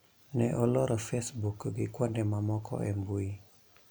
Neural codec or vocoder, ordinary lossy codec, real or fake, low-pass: none; none; real; none